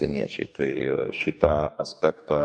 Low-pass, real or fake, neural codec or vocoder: 9.9 kHz; fake; codec, 44.1 kHz, 2.6 kbps, DAC